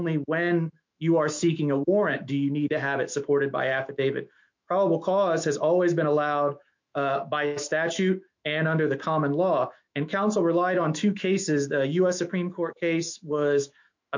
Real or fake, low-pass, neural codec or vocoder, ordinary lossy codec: fake; 7.2 kHz; autoencoder, 48 kHz, 128 numbers a frame, DAC-VAE, trained on Japanese speech; MP3, 48 kbps